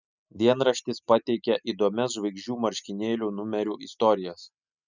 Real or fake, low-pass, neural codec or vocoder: real; 7.2 kHz; none